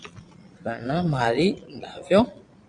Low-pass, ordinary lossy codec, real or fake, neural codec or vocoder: 9.9 kHz; MP3, 64 kbps; fake; vocoder, 22.05 kHz, 80 mel bands, Vocos